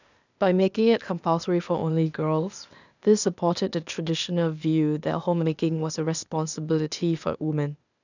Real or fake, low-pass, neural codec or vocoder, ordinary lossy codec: fake; 7.2 kHz; codec, 16 kHz, 0.8 kbps, ZipCodec; none